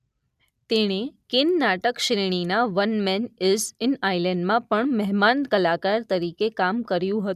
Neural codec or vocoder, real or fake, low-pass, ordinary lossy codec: none; real; 14.4 kHz; none